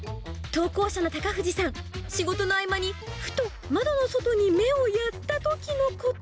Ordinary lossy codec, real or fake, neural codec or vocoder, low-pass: none; real; none; none